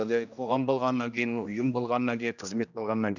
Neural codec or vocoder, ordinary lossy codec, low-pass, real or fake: codec, 16 kHz, 1 kbps, X-Codec, HuBERT features, trained on general audio; none; 7.2 kHz; fake